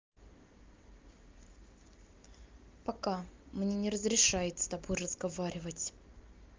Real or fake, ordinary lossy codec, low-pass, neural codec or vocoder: real; Opus, 32 kbps; 7.2 kHz; none